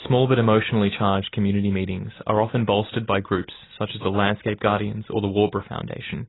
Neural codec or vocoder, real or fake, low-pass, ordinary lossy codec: none; real; 7.2 kHz; AAC, 16 kbps